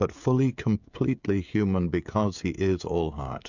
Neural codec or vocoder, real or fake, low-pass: codec, 16 kHz, 16 kbps, FreqCodec, smaller model; fake; 7.2 kHz